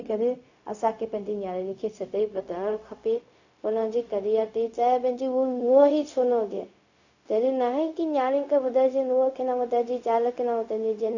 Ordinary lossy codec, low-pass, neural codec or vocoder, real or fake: none; 7.2 kHz; codec, 16 kHz, 0.4 kbps, LongCat-Audio-Codec; fake